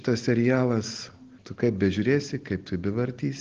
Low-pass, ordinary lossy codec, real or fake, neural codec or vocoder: 7.2 kHz; Opus, 16 kbps; real; none